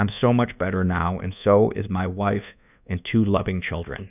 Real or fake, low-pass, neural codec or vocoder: fake; 3.6 kHz; codec, 24 kHz, 1.2 kbps, DualCodec